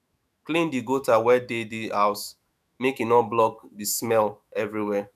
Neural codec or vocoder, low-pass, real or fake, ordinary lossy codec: autoencoder, 48 kHz, 128 numbers a frame, DAC-VAE, trained on Japanese speech; 14.4 kHz; fake; none